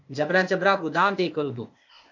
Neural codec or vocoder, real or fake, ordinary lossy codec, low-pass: codec, 16 kHz, 0.8 kbps, ZipCodec; fake; MP3, 48 kbps; 7.2 kHz